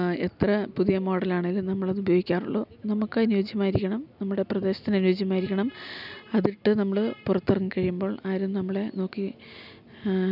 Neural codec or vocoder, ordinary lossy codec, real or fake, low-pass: none; none; real; 5.4 kHz